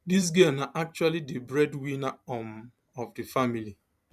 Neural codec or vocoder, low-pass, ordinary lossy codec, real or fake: vocoder, 44.1 kHz, 128 mel bands every 512 samples, BigVGAN v2; 14.4 kHz; none; fake